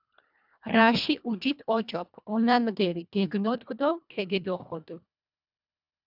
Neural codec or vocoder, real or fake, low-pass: codec, 24 kHz, 1.5 kbps, HILCodec; fake; 5.4 kHz